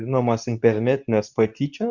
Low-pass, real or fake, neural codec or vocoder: 7.2 kHz; fake; codec, 24 kHz, 0.9 kbps, WavTokenizer, medium speech release version 2